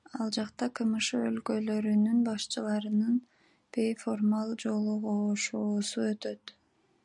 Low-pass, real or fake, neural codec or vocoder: 10.8 kHz; real; none